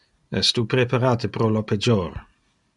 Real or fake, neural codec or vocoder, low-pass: real; none; 10.8 kHz